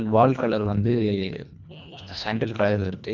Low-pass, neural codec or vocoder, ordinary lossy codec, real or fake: 7.2 kHz; codec, 24 kHz, 1.5 kbps, HILCodec; none; fake